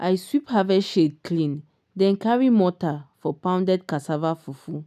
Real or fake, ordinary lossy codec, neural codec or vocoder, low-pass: real; none; none; 14.4 kHz